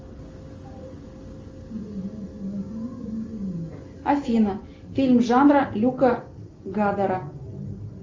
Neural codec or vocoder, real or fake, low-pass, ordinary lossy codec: none; real; 7.2 kHz; Opus, 32 kbps